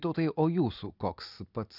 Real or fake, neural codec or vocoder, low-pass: real; none; 5.4 kHz